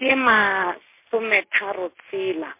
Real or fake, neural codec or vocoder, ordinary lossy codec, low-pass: real; none; MP3, 24 kbps; 3.6 kHz